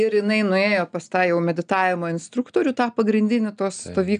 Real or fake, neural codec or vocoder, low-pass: real; none; 9.9 kHz